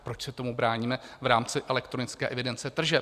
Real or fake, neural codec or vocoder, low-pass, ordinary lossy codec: real; none; 14.4 kHz; Opus, 64 kbps